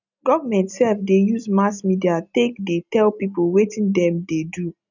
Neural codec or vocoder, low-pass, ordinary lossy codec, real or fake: none; 7.2 kHz; none; real